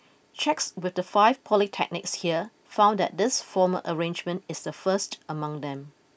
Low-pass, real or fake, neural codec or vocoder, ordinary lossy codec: none; real; none; none